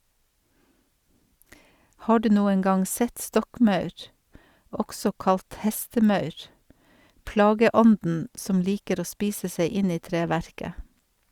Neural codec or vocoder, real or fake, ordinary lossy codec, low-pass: vocoder, 44.1 kHz, 128 mel bands every 512 samples, BigVGAN v2; fake; Opus, 64 kbps; 19.8 kHz